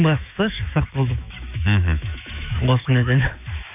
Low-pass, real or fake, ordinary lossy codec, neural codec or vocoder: 3.6 kHz; fake; none; autoencoder, 48 kHz, 128 numbers a frame, DAC-VAE, trained on Japanese speech